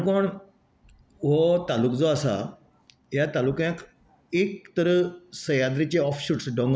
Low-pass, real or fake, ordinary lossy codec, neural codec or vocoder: none; real; none; none